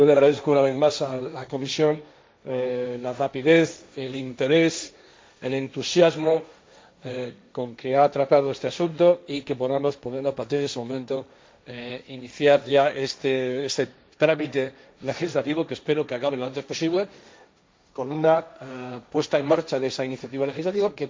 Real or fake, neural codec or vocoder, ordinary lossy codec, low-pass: fake; codec, 16 kHz, 1.1 kbps, Voila-Tokenizer; none; none